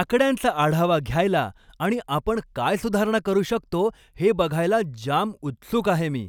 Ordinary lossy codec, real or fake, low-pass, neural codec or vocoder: none; real; 19.8 kHz; none